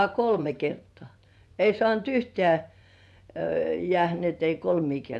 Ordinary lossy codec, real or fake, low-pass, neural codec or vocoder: none; fake; none; vocoder, 24 kHz, 100 mel bands, Vocos